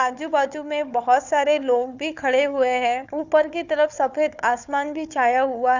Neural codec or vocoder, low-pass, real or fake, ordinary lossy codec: codec, 16 kHz, 4 kbps, FunCodec, trained on Chinese and English, 50 frames a second; 7.2 kHz; fake; none